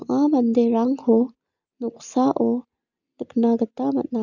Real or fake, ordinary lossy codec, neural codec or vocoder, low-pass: real; none; none; 7.2 kHz